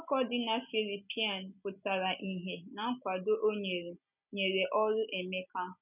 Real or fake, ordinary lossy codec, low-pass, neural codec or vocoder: real; none; 3.6 kHz; none